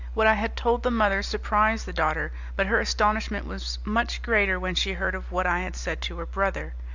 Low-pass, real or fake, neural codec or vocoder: 7.2 kHz; real; none